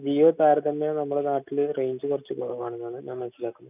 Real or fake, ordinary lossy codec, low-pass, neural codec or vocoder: real; none; 3.6 kHz; none